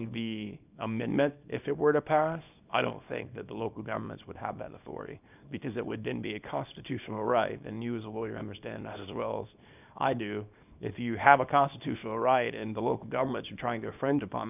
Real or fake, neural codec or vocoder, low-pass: fake; codec, 24 kHz, 0.9 kbps, WavTokenizer, small release; 3.6 kHz